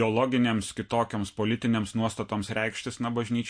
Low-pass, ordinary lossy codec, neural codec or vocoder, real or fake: 9.9 kHz; MP3, 48 kbps; none; real